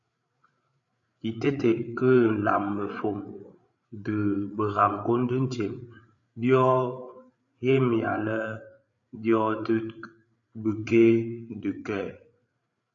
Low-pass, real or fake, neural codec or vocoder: 7.2 kHz; fake; codec, 16 kHz, 8 kbps, FreqCodec, larger model